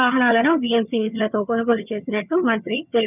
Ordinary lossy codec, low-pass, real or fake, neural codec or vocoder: none; 3.6 kHz; fake; vocoder, 22.05 kHz, 80 mel bands, HiFi-GAN